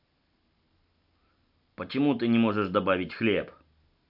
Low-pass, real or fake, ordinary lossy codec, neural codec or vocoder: 5.4 kHz; real; AAC, 48 kbps; none